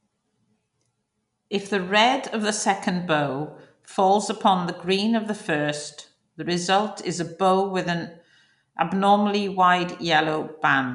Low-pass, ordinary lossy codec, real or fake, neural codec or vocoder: 10.8 kHz; none; real; none